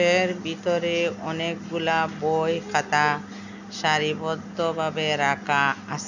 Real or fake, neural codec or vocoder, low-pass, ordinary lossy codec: real; none; 7.2 kHz; none